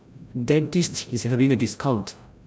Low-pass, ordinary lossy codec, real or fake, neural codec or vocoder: none; none; fake; codec, 16 kHz, 0.5 kbps, FreqCodec, larger model